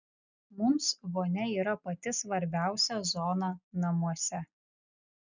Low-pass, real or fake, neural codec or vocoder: 7.2 kHz; real; none